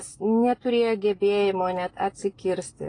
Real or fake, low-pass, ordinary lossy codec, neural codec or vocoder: real; 9.9 kHz; AAC, 32 kbps; none